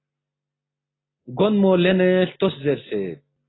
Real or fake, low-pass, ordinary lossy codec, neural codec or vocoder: real; 7.2 kHz; AAC, 16 kbps; none